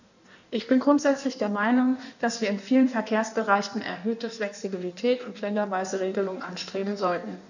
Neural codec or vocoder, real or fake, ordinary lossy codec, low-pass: codec, 16 kHz in and 24 kHz out, 1.1 kbps, FireRedTTS-2 codec; fake; none; 7.2 kHz